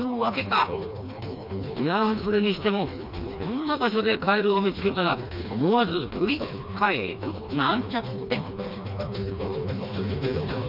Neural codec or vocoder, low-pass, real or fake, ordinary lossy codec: codec, 16 kHz, 2 kbps, FreqCodec, smaller model; 5.4 kHz; fake; none